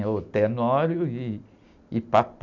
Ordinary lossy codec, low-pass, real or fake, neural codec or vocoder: MP3, 64 kbps; 7.2 kHz; real; none